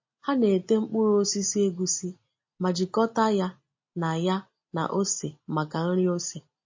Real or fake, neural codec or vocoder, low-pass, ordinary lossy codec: real; none; 7.2 kHz; MP3, 32 kbps